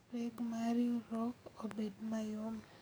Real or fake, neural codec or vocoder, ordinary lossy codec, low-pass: fake; codec, 44.1 kHz, 7.8 kbps, DAC; none; none